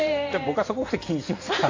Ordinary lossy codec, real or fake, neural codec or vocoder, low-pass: AAC, 32 kbps; fake; vocoder, 44.1 kHz, 128 mel bands every 512 samples, BigVGAN v2; 7.2 kHz